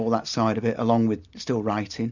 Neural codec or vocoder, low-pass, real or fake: none; 7.2 kHz; real